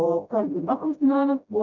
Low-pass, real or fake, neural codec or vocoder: 7.2 kHz; fake; codec, 16 kHz, 0.5 kbps, FreqCodec, smaller model